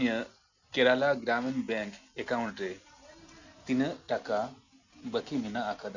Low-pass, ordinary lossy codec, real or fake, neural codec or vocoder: 7.2 kHz; none; real; none